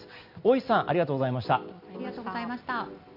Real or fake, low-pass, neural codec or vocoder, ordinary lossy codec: real; 5.4 kHz; none; none